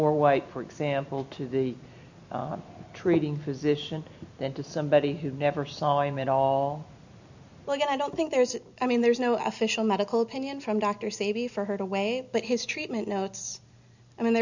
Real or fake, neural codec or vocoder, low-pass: real; none; 7.2 kHz